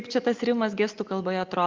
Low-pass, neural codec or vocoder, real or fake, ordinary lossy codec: 7.2 kHz; none; real; Opus, 24 kbps